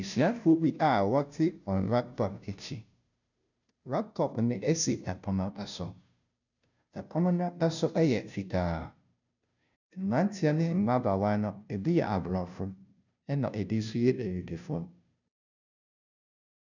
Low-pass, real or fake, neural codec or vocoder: 7.2 kHz; fake; codec, 16 kHz, 0.5 kbps, FunCodec, trained on Chinese and English, 25 frames a second